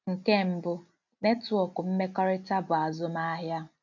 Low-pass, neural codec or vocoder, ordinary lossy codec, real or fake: 7.2 kHz; none; none; real